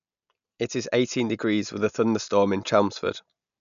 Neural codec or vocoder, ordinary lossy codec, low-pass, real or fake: none; none; 7.2 kHz; real